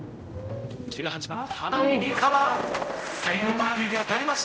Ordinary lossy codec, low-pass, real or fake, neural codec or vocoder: none; none; fake; codec, 16 kHz, 0.5 kbps, X-Codec, HuBERT features, trained on general audio